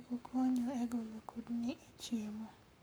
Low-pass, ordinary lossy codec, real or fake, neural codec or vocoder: none; none; fake; codec, 44.1 kHz, 7.8 kbps, Pupu-Codec